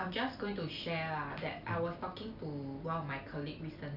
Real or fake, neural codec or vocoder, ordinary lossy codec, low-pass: real; none; none; 5.4 kHz